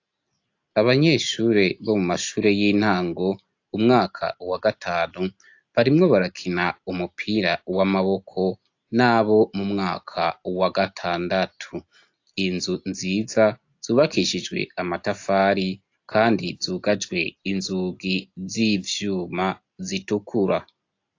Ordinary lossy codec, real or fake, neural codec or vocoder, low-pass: AAC, 48 kbps; real; none; 7.2 kHz